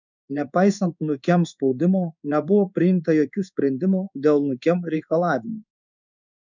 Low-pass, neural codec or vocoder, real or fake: 7.2 kHz; codec, 16 kHz in and 24 kHz out, 1 kbps, XY-Tokenizer; fake